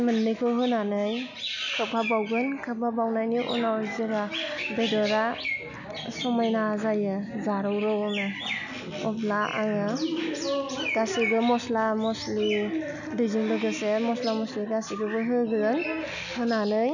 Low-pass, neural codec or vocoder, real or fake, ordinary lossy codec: 7.2 kHz; none; real; none